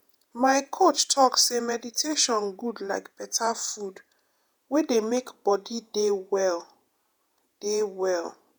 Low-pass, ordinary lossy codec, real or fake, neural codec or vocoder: none; none; fake; vocoder, 48 kHz, 128 mel bands, Vocos